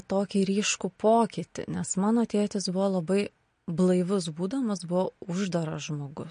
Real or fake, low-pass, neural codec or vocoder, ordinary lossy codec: real; 9.9 kHz; none; MP3, 48 kbps